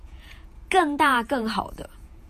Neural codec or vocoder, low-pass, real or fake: vocoder, 48 kHz, 128 mel bands, Vocos; 14.4 kHz; fake